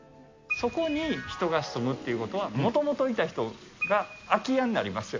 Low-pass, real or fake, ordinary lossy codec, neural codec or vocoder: 7.2 kHz; real; MP3, 48 kbps; none